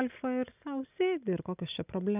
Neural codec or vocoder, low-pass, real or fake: none; 3.6 kHz; real